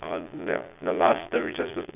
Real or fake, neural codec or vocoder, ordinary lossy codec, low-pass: fake; vocoder, 22.05 kHz, 80 mel bands, Vocos; none; 3.6 kHz